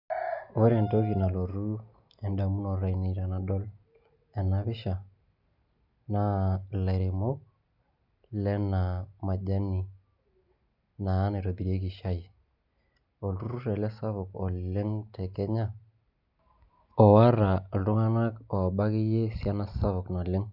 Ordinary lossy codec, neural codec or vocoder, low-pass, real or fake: none; none; 5.4 kHz; real